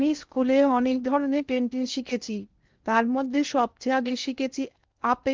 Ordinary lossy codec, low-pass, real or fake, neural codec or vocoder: Opus, 32 kbps; 7.2 kHz; fake; codec, 16 kHz in and 24 kHz out, 0.6 kbps, FocalCodec, streaming, 2048 codes